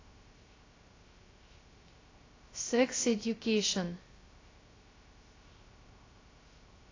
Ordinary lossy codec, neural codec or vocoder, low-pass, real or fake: AAC, 32 kbps; codec, 16 kHz, 0.3 kbps, FocalCodec; 7.2 kHz; fake